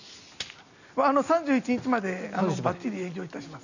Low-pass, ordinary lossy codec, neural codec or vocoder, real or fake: 7.2 kHz; none; none; real